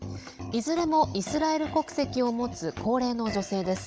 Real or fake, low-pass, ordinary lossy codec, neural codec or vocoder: fake; none; none; codec, 16 kHz, 16 kbps, FunCodec, trained on Chinese and English, 50 frames a second